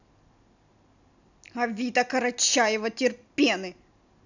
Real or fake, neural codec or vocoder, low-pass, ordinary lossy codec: real; none; 7.2 kHz; none